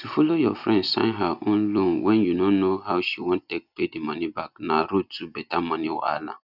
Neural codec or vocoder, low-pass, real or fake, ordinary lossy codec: none; 5.4 kHz; real; none